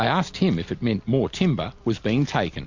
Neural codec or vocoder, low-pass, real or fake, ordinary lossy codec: none; 7.2 kHz; real; MP3, 48 kbps